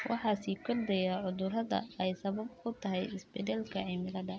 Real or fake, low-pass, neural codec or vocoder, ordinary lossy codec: real; none; none; none